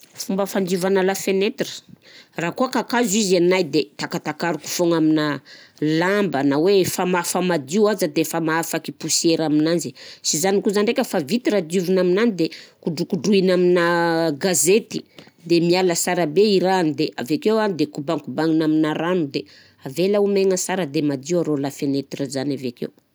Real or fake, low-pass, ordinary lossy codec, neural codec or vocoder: real; none; none; none